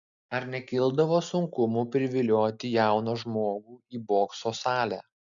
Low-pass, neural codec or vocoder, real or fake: 7.2 kHz; none; real